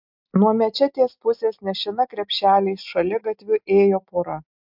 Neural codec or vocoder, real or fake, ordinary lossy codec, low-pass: none; real; MP3, 48 kbps; 5.4 kHz